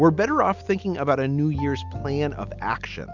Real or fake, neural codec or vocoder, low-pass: real; none; 7.2 kHz